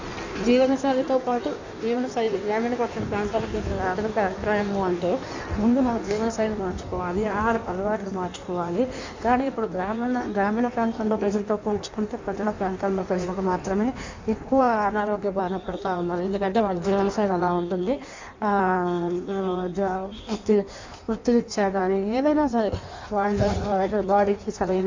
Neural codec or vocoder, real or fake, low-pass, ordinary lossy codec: codec, 16 kHz in and 24 kHz out, 1.1 kbps, FireRedTTS-2 codec; fake; 7.2 kHz; MP3, 64 kbps